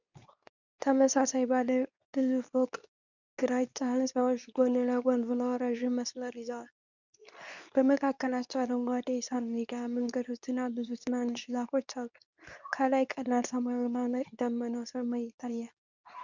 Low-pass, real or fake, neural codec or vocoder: 7.2 kHz; fake; codec, 24 kHz, 0.9 kbps, WavTokenizer, medium speech release version 2